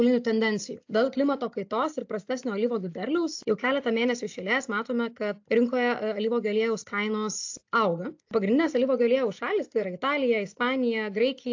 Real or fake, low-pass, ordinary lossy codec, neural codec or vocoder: real; 7.2 kHz; AAC, 48 kbps; none